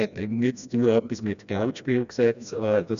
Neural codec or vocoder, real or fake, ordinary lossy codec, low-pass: codec, 16 kHz, 1 kbps, FreqCodec, smaller model; fake; none; 7.2 kHz